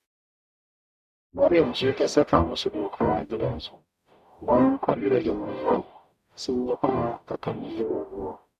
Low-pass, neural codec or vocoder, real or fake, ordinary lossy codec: 14.4 kHz; codec, 44.1 kHz, 0.9 kbps, DAC; fake; none